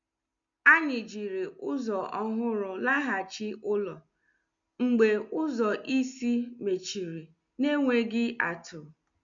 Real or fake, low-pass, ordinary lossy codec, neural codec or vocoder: real; 7.2 kHz; MP3, 64 kbps; none